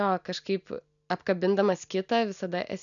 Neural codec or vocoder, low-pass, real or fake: none; 7.2 kHz; real